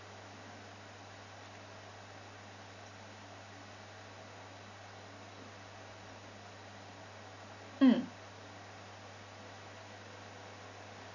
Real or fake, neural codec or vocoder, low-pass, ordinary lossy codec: real; none; 7.2 kHz; none